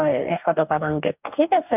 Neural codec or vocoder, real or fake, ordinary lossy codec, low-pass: codec, 44.1 kHz, 2.6 kbps, DAC; fake; none; 3.6 kHz